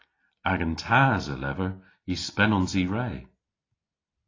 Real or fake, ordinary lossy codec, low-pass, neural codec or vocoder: real; AAC, 32 kbps; 7.2 kHz; none